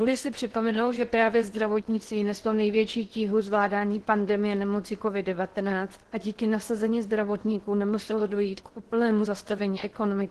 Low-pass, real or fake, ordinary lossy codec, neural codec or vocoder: 10.8 kHz; fake; Opus, 16 kbps; codec, 16 kHz in and 24 kHz out, 0.8 kbps, FocalCodec, streaming, 65536 codes